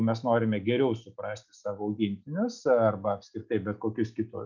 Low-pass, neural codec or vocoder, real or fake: 7.2 kHz; none; real